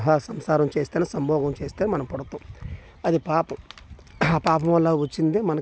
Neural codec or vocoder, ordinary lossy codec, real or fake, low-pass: none; none; real; none